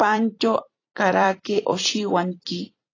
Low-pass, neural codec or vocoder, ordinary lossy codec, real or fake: 7.2 kHz; vocoder, 44.1 kHz, 128 mel bands every 256 samples, BigVGAN v2; AAC, 32 kbps; fake